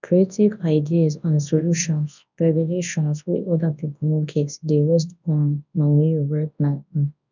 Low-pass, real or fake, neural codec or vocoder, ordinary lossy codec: 7.2 kHz; fake; codec, 24 kHz, 0.9 kbps, WavTokenizer, large speech release; none